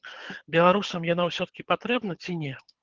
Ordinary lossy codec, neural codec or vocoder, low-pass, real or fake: Opus, 16 kbps; codec, 24 kHz, 6 kbps, HILCodec; 7.2 kHz; fake